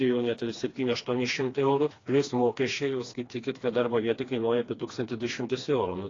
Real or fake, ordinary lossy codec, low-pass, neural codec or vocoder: fake; AAC, 32 kbps; 7.2 kHz; codec, 16 kHz, 2 kbps, FreqCodec, smaller model